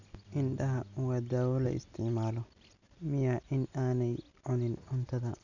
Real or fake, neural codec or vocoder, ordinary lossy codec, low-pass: real; none; none; 7.2 kHz